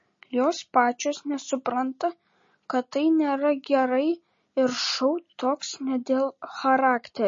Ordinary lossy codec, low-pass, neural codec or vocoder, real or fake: MP3, 32 kbps; 7.2 kHz; none; real